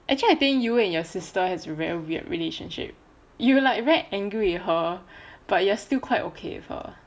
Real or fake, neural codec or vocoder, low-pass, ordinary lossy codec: real; none; none; none